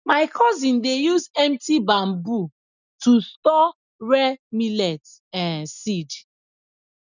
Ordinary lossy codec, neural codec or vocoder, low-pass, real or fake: none; none; 7.2 kHz; real